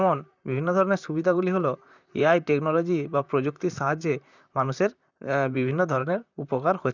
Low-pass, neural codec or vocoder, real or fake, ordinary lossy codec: 7.2 kHz; vocoder, 44.1 kHz, 128 mel bands, Pupu-Vocoder; fake; none